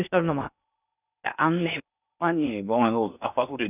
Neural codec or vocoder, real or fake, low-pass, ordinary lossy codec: codec, 16 kHz in and 24 kHz out, 0.8 kbps, FocalCodec, streaming, 65536 codes; fake; 3.6 kHz; none